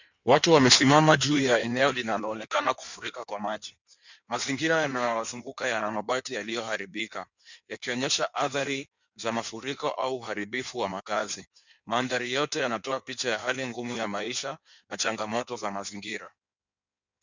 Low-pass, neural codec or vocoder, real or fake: 7.2 kHz; codec, 16 kHz in and 24 kHz out, 1.1 kbps, FireRedTTS-2 codec; fake